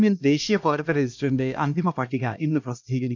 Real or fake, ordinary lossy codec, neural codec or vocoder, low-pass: fake; none; codec, 16 kHz, 1 kbps, X-Codec, HuBERT features, trained on LibriSpeech; none